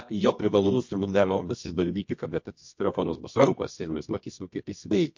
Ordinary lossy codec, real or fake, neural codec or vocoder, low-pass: MP3, 48 kbps; fake; codec, 24 kHz, 0.9 kbps, WavTokenizer, medium music audio release; 7.2 kHz